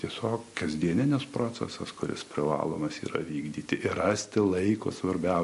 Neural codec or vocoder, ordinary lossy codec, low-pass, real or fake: none; MP3, 48 kbps; 14.4 kHz; real